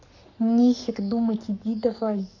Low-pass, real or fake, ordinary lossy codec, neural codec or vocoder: 7.2 kHz; fake; none; codec, 44.1 kHz, 7.8 kbps, Pupu-Codec